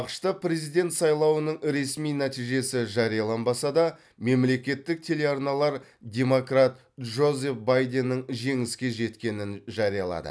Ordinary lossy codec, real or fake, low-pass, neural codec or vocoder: none; real; none; none